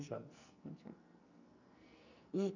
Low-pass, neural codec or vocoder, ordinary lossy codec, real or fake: 7.2 kHz; codec, 44.1 kHz, 2.6 kbps, SNAC; none; fake